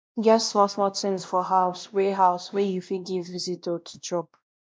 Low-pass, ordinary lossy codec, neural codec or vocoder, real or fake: none; none; codec, 16 kHz, 1 kbps, X-Codec, WavLM features, trained on Multilingual LibriSpeech; fake